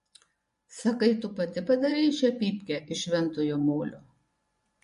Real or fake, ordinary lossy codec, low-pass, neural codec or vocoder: fake; MP3, 48 kbps; 10.8 kHz; vocoder, 24 kHz, 100 mel bands, Vocos